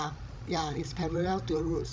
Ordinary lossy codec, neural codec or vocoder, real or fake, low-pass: none; codec, 16 kHz, 16 kbps, FreqCodec, larger model; fake; none